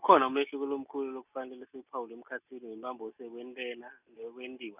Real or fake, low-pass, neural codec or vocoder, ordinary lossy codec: real; 3.6 kHz; none; none